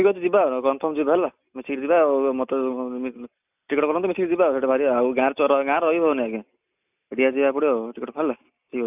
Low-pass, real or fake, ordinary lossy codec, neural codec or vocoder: 3.6 kHz; real; none; none